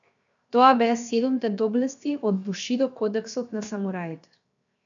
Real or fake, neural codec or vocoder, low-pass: fake; codec, 16 kHz, 0.7 kbps, FocalCodec; 7.2 kHz